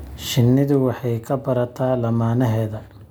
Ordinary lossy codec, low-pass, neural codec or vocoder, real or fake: none; none; none; real